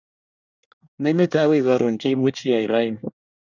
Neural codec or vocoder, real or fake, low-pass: codec, 24 kHz, 1 kbps, SNAC; fake; 7.2 kHz